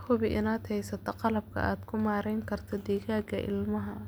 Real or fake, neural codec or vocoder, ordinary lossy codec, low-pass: real; none; none; none